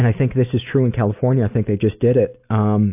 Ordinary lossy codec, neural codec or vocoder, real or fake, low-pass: AAC, 32 kbps; none; real; 3.6 kHz